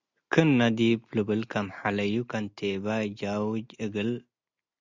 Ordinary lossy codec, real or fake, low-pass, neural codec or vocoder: Opus, 64 kbps; real; 7.2 kHz; none